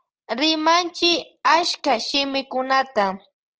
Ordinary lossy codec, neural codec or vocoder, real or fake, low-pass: Opus, 16 kbps; none; real; 7.2 kHz